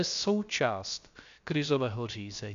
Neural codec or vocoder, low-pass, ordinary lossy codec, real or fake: codec, 16 kHz, about 1 kbps, DyCAST, with the encoder's durations; 7.2 kHz; MP3, 64 kbps; fake